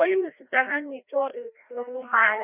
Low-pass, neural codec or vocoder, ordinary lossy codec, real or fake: 3.6 kHz; codec, 16 kHz, 1 kbps, FreqCodec, larger model; none; fake